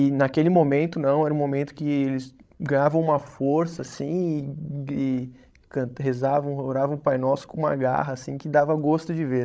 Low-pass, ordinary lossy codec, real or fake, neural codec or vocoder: none; none; fake; codec, 16 kHz, 16 kbps, FreqCodec, larger model